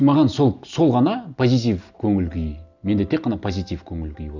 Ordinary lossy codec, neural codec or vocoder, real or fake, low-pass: none; none; real; 7.2 kHz